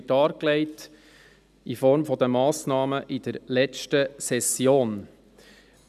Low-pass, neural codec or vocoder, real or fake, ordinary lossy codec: 14.4 kHz; none; real; none